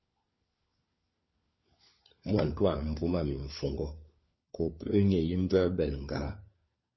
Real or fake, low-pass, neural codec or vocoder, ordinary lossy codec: fake; 7.2 kHz; codec, 16 kHz, 4 kbps, FunCodec, trained on LibriTTS, 50 frames a second; MP3, 24 kbps